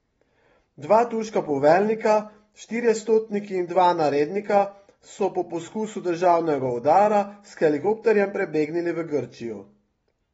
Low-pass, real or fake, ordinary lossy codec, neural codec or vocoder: 10.8 kHz; real; AAC, 24 kbps; none